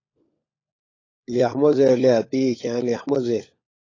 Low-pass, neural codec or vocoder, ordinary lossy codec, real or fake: 7.2 kHz; codec, 16 kHz, 16 kbps, FunCodec, trained on LibriTTS, 50 frames a second; AAC, 32 kbps; fake